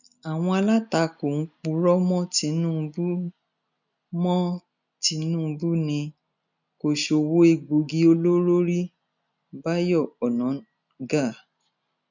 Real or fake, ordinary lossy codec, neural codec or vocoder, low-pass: real; none; none; 7.2 kHz